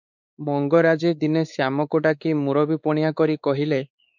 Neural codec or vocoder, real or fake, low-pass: codec, 16 kHz, 4 kbps, X-Codec, WavLM features, trained on Multilingual LibriSpeech; fake; 7.2 kHz